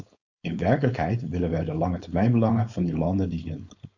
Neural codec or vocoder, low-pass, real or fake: codec, 16 kHz, 4.8 kbps, FACodec; 7.2 kHz; fake